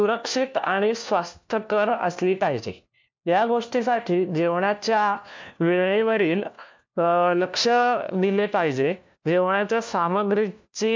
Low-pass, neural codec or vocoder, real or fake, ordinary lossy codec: 7.2 kHz; codec, 16 kHz, 1 kbps, FunCodec, trained on LibriTTS, 50 frames a second; fake; MP3, 64 kbps